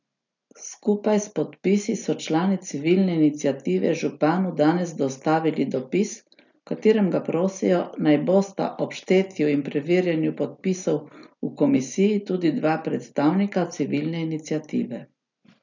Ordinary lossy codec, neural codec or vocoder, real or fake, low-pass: none; none; real; 7.2 kHz